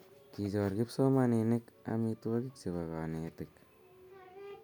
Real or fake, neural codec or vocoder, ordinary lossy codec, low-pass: real; none; none; none